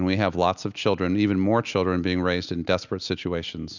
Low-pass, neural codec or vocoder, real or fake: 7.2 kHz; none; real